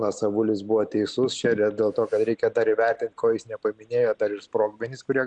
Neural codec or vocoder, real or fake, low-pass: none; real; 9.9 kHz